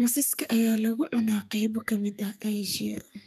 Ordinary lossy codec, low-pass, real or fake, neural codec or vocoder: none; 14.4 kHz; fake; codec, 32 kHz, 1.9 kbps, SNAC